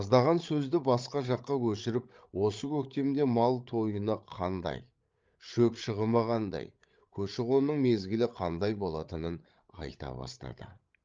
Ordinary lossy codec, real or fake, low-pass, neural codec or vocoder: Opus, 24 kbps; fake; 7.2 kHz; codec, 16 kHz, 8 kbps, FreqCodec, larger model